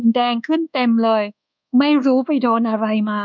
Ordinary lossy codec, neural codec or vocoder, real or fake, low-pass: none; codec, 24 kHz, 1.2 kbps, DualCodec; fake; 7.2 kHz